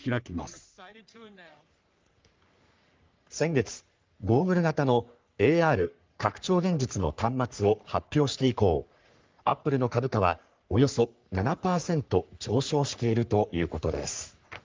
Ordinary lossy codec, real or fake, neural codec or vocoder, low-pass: Opus, 24 kbps; fake; codec, 44.1 kHz, 3.4 kbps, Pupu-Codec; 7.2 kHz